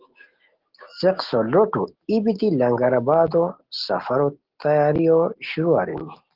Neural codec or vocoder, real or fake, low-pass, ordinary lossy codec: none; real; 5.4 kHz; Opus, 16 kbps